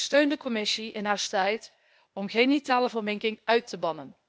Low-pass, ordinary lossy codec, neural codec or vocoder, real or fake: none; none; codec, 16 kHz, 0.8 kbps, ZipCodec; fake